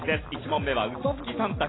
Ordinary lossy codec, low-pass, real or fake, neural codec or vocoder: AAC, 16 kbps; 7.2 kHz; real; none